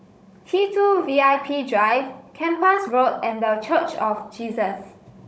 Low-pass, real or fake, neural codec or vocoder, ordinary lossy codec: none; fake; codec, 16 kHz, 16 kbps, FunCodec, trained on Chinese and English, 50 frames a second; none